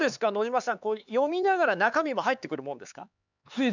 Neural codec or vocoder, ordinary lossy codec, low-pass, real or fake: codec, 16 kHz, 4 kbps, X-Codec, HuBERT features, trained on LibriSpeech; none; 7.2 kHz; fake